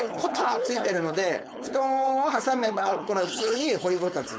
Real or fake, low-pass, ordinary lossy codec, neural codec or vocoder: fake; none; none; codec, 16 kHz, 4.8 kbps, FACodec